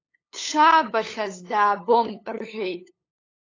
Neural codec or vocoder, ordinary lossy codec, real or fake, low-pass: codec, 16 kHz, 8 kbps, FunCodec, trained on LibriTTS, 25 frames a second; AAC, 32 kbps; fake; 7.2 kHz